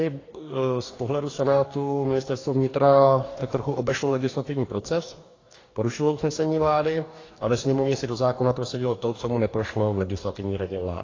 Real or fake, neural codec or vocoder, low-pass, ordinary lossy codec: fake; codec, 44.1 kHz, 2.6 kbps, DAC; 7.2 kHz; AAC, 32 kbps